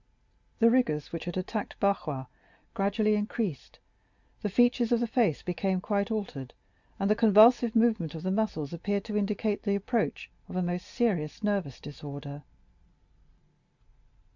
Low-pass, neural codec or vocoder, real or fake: 7.2 kHz; none; real